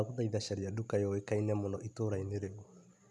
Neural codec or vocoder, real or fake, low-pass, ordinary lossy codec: none; real; none; none